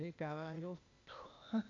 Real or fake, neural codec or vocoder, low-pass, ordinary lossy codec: fake; codec, 16 kHz, 0.8 kbps, ZipCodec; 7.2 kHz; none